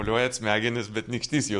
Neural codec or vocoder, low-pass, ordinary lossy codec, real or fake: none; 10.8 kHz; MP3, 96 kbps; real